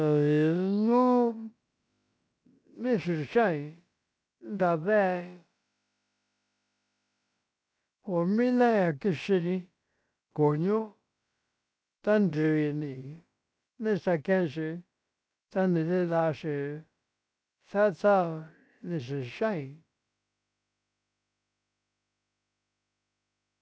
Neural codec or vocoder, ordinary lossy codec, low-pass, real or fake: codec, 16 kHz, about 1 kbps, DyCAST, with the encoder's durations; none; none; fake